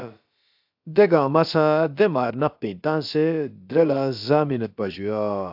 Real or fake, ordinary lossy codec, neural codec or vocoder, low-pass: fake; AAC, 48 kbps; codec, 16 kHz, about 1 kbps, DyCAST, with the encoder's durations; 5.4 kHz